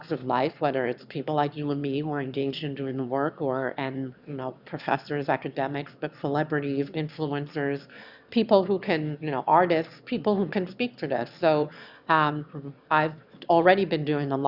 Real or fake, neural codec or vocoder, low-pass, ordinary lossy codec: fake; autoencoder, 22.05 kHz, a latent of 192 numbers a frame, VITS, trained on one speaker; 5.4 kHz; Opus, 64 kbps